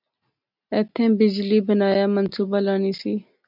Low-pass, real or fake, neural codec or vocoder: 5.4 kHz; real; none